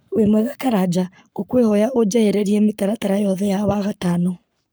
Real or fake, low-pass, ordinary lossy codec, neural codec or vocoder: fake; none; none; codec, 44.1 kHz, 7.8 kbps, Pupu-Codec